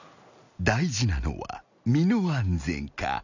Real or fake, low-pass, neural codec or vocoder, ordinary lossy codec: real; 7.2 kHz; none; none